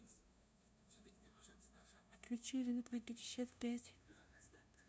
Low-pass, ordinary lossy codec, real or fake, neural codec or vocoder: none; none; fake; codec, 16 kHz, 0.5 kbps, FunCodec, trained on LibriTTS, 25 frames a second